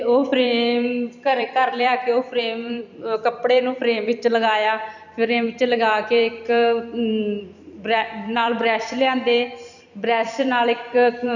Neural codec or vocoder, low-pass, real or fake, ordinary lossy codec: vocoder, 44.1 kHz, 128 mel bands every 256 samples, BigVGAN v2; 7.2 kHz; fake; none